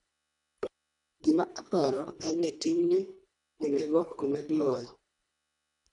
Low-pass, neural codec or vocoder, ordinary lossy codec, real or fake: 10.8 kHz; codec, 24 kHz, 1.5 kbps, HILCodec; none; fake